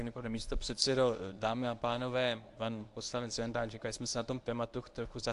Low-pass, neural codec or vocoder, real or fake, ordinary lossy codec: 10.8 kHz; codec, 24 kHz, 0.9 kbps, WavTokenizer, medium speech release version 1; fake; AAC, 64 kbps